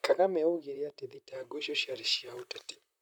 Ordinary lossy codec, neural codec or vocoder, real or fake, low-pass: none; vocoder, 44.1 kHz, 128 mel bands, Pupu-Vocoder; fake; none